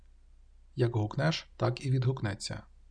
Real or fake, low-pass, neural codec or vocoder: real; 9.9 kHz; none